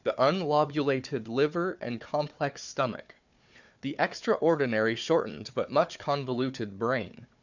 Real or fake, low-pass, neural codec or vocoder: fake; 7.2 kHz; codec, 16 kHz, 4 kbps, FunCodec, trained on Chinese and English, 50 frames a second